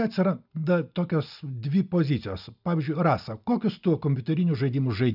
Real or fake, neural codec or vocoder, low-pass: real; none; 5.4 kHz